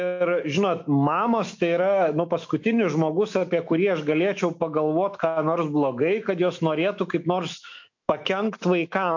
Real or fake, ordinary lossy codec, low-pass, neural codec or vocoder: fake; MP3, 48 kbps; 7.2 kHz; autoencoder, 48 kHz, 128 numbers a frame, DAC-VAE, trained on Japanese speech